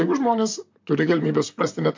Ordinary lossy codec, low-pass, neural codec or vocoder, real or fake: MP3, 48 kbps; 7.2 kHz; vocoder, 44.1 kHz, 128 mel bands, Pupu-Vocoder; fake